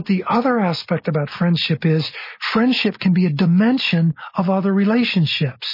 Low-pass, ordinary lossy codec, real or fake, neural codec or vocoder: 5.4 kHz; MP3, 24 kbps; real; none